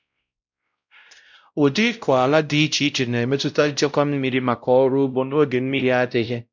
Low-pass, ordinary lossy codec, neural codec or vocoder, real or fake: 7.2 kHz; none; codec, 16 kHz, 0.5 kbps, X-Codec, WavLM features, trained on Multilingual LibriSpeech; fake